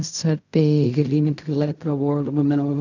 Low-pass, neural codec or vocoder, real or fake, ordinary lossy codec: 7.2 kHz; codec, 16 kHz in and 24 kHz out, 0.4 kbps, LongCat-Audio-Codec, fine tuned four codebook decoder; fake; none